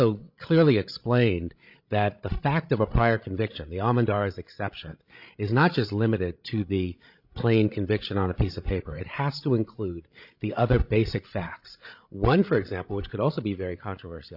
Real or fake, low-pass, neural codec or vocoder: fake; 5.4 kHz; codec, 16 kHz, 16 kbps, FreqCodec, larger model